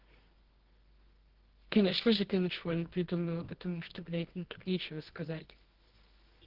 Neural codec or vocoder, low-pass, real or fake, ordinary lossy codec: codec, 24 kHz, 0.9 kbps, WavTokenizer, medium music audio release; 5.4 kHz; fake; Opus, 16 kbps